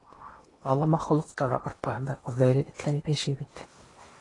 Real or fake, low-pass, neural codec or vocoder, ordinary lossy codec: fake; 10.8 kHz; codec, 16 kHz in and 24 kHz out, 0.8 kbps, FocalCodec, streaming, 65536 codes; AAC, 32 kbps